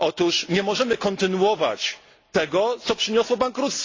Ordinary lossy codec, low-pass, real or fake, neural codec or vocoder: AAC, 32 kbps; 7.2 kHz; real; none